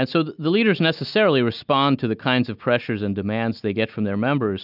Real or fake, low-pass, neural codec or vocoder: real; 5.4 kHz; none